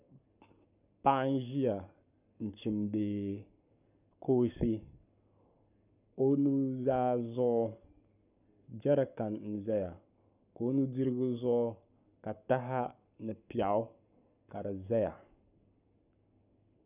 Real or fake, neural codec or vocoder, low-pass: fake; codec, 44.1 kHz, 7.8 kbps, Pupu-Codec; 3.6 kHz